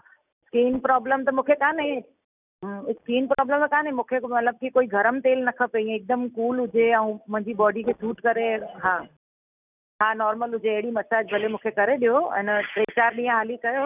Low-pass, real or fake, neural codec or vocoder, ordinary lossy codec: 3.6 kHz; real; none; none